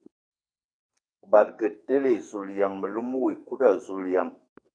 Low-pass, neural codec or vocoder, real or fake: 9.9 kHz; codec, 44.1 kHz, 2.6 kbps, SNAC; fake